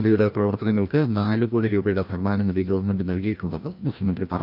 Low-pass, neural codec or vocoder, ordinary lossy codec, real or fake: 5.4 kHz; codec, 16 kHz, 1 kbps, FreqCodec, larger model; none; fake